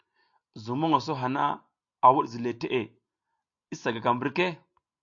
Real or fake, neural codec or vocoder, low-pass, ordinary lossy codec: real; none; 7.2 kHz; AAC, 64 kbps